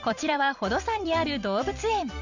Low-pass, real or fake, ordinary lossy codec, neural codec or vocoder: 7.2 kHz; fake; none; vocoder, 44.1 kHz, 128 mel bands every 256 samples, BigVGAN v2